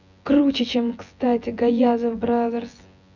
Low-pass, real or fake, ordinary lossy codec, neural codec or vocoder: 7.2 kHz; fake; none; vocoder, 24 kHz, 100 mel bands, Vocos